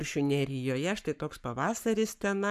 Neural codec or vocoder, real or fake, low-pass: codec, 44.1 kHz, 7.8 kbps, Pupu-Codec; fake; 14.4 kHz